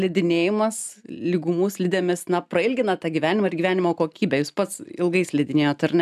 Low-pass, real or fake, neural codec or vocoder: 14.4 kHz; real; none